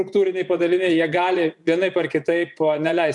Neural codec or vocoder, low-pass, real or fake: vocoder, 24 kHz, 100 mel bands, Vocos; 10.8 kHz; fake